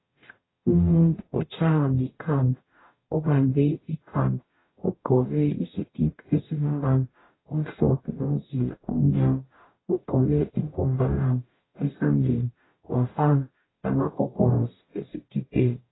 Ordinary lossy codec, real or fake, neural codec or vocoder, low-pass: AAC, 16 kbps; fake; codec, 44.1 kHz, 0.9 kbps, DAC; 7.2 kHz